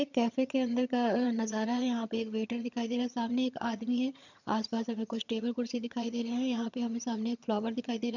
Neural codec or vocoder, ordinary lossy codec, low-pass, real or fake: vocoder, 22.05 kHz, 80 mel bands, HiFi-GAN; none; 7.2 kHz; fake